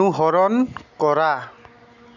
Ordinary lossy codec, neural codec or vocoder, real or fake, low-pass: none; none; real; 7.2 kHz